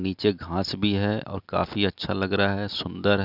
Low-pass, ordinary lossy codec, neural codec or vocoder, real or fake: 5.4 kHz; none; none; real